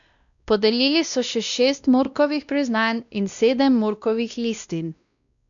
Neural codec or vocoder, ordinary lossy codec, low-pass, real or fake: codec, 16 kHz, 1 kbps, X-Codec, WavLM features, trained on Multilingual LibriSpeech; none; 7.2 kHz; fake